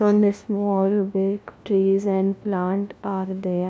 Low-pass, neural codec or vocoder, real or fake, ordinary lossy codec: none; codec, 16 kHz, 1 kbps, FunCodec, trained on LibriTTS, 50 frames a second; fake; none